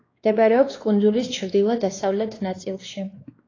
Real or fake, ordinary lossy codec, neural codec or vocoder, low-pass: fake; AAC, 32 kbps; codec, 16 kHz, 2 kbps, X-Codec, WavLM features, trained on Multilingual LibriSpeech; 7.2 kHz